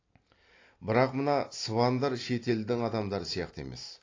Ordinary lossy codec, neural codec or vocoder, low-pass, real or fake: AAC, 32 kbps; none; 7.2 kHz; real